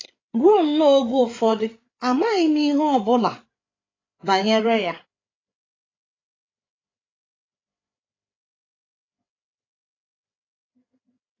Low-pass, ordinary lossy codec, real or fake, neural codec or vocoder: 7.2 kHz; AAC, 32 kbps; fake; codec, 16 kHz, 8 kbps, FreqCodec, larger model